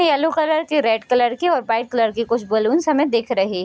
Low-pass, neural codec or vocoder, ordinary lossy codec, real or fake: none; none; none; real